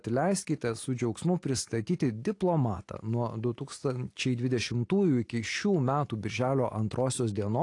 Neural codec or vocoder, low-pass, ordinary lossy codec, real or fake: none; 10.8 kHz; AAC, 48 kbps; real